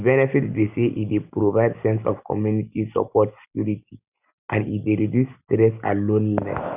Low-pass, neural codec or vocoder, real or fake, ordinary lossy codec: 3.6 kHz; none; real; none